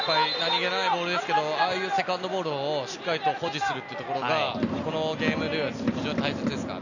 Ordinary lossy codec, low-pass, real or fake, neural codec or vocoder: none; 7.2 kHz; real; none